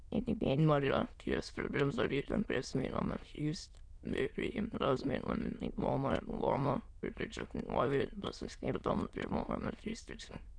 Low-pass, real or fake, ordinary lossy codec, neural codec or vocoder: 9.9 kHz; fake; none; autoencoder, 22.05 kHz, a latent of 192 numbers a frame, VITS, trained on many speakers